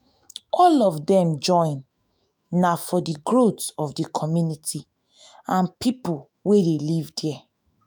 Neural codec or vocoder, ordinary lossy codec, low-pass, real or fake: autoencoder, 48 kHz, 128 numbers a frame, DAC-VAE, trained on Japanese speech; none; none; fake